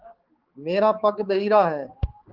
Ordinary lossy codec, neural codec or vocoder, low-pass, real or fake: Opus, 16 kbps; codec, 16 kHz, 4 kbps, X-Codec, HuBERT features, trained on balanced general audio; 5.4 kHz; fake